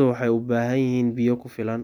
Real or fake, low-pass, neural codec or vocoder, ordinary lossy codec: real; 19.8 kHz; none; none